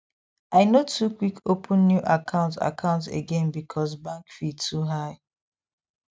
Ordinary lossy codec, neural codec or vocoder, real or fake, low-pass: none; none; real; none